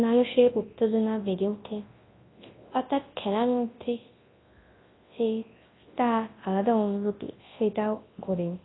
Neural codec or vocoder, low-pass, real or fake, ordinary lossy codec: codec, 24 kHz, 0.9 kbps, WavTokenizer, large speech release; 7.2 kHz; fake; AAC, 16 kbps